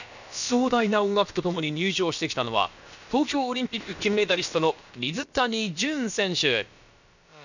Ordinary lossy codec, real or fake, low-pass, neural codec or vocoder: none; fake; 7.2 kHz; codec, 16 kHz, about 1 kbps, DyCAST, with the encoder's durations